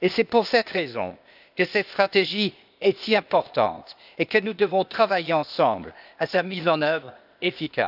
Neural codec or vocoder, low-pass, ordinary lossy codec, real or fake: codec, 16 kHz, 0.8 kbps, ZipCodec; 5.4 kHz; none; fake